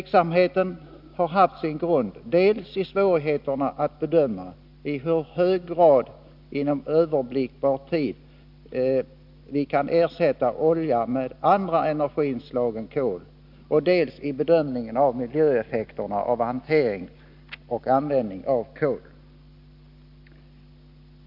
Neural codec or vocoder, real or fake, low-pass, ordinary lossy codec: none; real; 5.4 kHz; none